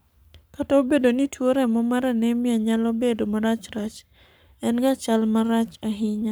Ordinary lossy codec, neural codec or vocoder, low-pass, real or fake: none; codec, 44.1 kHz, 7.8 kbps, Pupu-Codec; none; fake